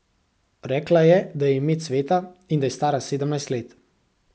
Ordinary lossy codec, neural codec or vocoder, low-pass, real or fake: none; none; none; real